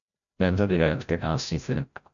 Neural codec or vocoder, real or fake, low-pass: codec, 16 kHz, 0.5 kbps, FreqCodec, larger model; fake; 7.2 kHz